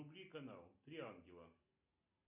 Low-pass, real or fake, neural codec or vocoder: 3.6 kHz; real; none